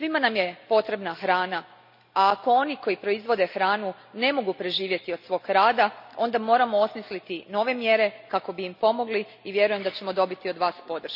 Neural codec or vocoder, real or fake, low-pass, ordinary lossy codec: none; real; 5.4 kHz; none